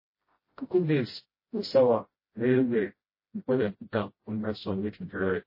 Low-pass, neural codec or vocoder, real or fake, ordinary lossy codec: 5.4 kHz; codec, 16 kHz, 0.5 kbps, FreqCodec, smaller model; fake; MP3, 24 kbps